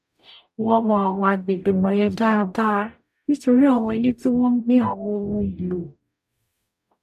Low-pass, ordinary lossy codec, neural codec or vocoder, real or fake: 14.4 kHz; none; codec, 44.1 kHz, 0.9 kbps, DAC; fake